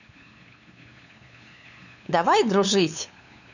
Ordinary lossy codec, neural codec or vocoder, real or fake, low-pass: none; codec, 16 kHz, 8 kbps, FunCodec, trained on LibriTTS, 25 frames a second; fake; 7.2 kHz